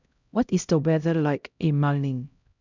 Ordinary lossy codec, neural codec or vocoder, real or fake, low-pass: none; codec, 16 kHz, 0.5 kbps, X-Codec, HuBERT features, trained on LibriSpeech; fake; 7.2 kHz